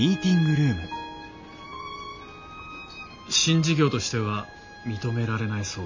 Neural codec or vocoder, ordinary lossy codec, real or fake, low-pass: none; MP3, 64 kbps; real; 7.2 kHz